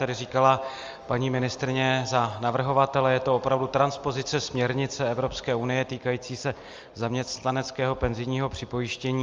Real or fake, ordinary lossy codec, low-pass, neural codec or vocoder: real; Opus, 32 kbps; 7.2 kHz; none